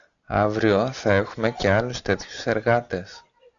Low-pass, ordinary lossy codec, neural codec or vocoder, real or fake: 7.2 kHz; MP3, 96 kbps; none; real